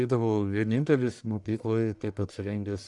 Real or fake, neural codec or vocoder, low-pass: fake; codec, 44.1 kHz, 1.7 kbps, Pupu-Codec; 10.8 kHz